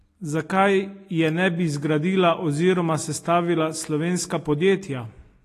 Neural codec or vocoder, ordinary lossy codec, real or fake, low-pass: none; AAC, 48 kbps; real; 14.4 kHz